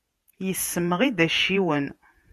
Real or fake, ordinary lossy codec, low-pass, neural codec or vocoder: fake; MP3, 96 kbps; 14.4 kHz; vocoder, 48 kHz, 128 mel bands, Vocos